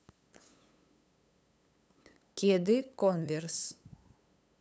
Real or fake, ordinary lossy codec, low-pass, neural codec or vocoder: fake; none; none; codec, 16 kHz, 8 kbps, FunCodec, trained on LibriTTS, 25 frames a second